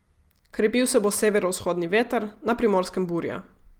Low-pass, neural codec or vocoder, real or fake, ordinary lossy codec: 19.8 kHz; none; real; Opus, 24 kbps